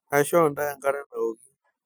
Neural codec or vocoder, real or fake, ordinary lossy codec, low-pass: none; real; none; none